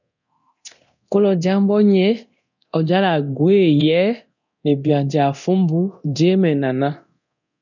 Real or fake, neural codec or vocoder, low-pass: fake; codec, 24 kHz, 0.9 kbps, DualCodec; 7.2 kHz